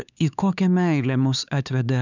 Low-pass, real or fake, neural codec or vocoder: 7.2 kHz; fake; codec, 16 kHz, 4 kbps, X-Codec, HuBERT features, trained on LibriSpeech